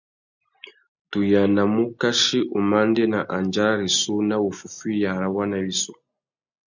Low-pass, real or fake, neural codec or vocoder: 7.2 kHz; real; none